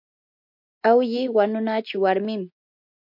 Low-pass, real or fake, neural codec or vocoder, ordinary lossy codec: 5.4 kHz; real; none; MP3, 48 kbps